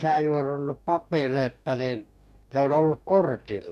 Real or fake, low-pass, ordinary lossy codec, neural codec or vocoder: fake; 14.4 kHz; none; codec, 44.1 kHz, 2.6 kbps, DAC